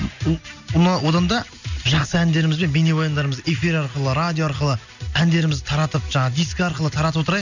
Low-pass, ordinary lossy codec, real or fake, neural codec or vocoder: 7.2 kHz; none; real; none